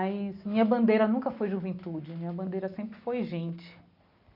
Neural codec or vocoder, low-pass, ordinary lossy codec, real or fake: none; 5.4 kHz; none; real